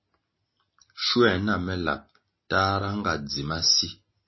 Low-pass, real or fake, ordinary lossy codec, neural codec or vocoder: 7.2 kHz; real; MP3, 24 kbps; none